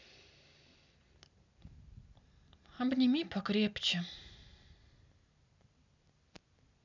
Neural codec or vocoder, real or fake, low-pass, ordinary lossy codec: none; real; 7.2 kHz; none